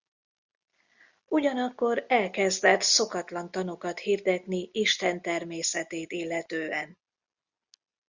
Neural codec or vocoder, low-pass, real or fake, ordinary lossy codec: none; 7.2 kHz; real; Opus, 64 kbps